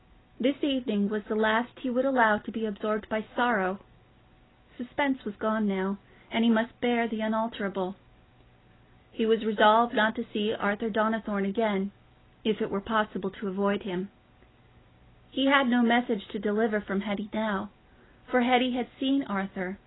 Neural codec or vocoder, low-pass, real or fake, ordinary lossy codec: none; 7.2 kHz; real; AAC, 16 kbps